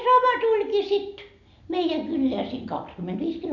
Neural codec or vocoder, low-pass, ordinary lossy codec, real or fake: none; 7.2 kHz; none; real